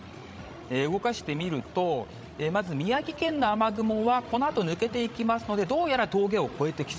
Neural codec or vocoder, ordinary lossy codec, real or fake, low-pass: codec, 16 kHz, 16 kbps, FreqCodec, larger model; none; fake; none